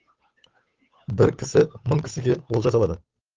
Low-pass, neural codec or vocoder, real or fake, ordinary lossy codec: 7.2 kHz; codec, 16 kHz, 8 kbps, FunCodec, trained on Chinese and English, 25 frames a second; fake; Opus, 32 kbps